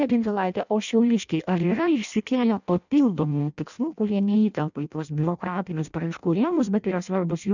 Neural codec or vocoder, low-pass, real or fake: codec, 16 kHz in and 24 kHz out, 0.6 kbps, FireRedTTS-2 codec; 7.2 kHz; fake